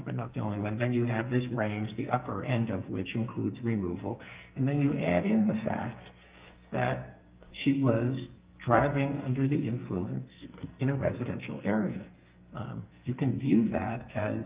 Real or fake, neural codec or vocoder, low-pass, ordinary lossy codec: fake; codec, 44.1 kHz, 2.6 kbps, SNAC; 3.6 kHz; Opus, 24 kbps